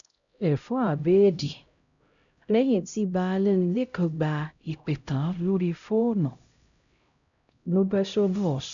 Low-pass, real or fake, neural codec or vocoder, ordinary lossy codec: 7.2 kHz; fake; codec, 16 kHz, 0.5 kbps, X-Codec, HuBERT features, trained on LibriSpeech; none